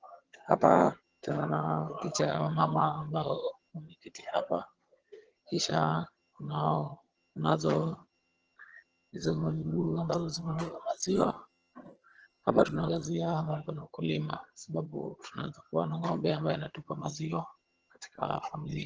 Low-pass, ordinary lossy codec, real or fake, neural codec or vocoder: 7.2 kHz; Opus, 16 kbps; fake; vocoder, 22.05 kHz, 80 mel bands, HiFi-GAN